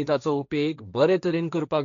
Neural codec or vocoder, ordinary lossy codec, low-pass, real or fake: codec, 16 kHz, 1.1 kbps, Voila-Tokenizer; none; 7.2 kHz; fake